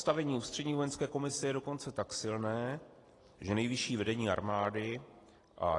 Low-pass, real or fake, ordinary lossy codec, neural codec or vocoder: 10.8 kHz; real; AAC, 32 kbps; none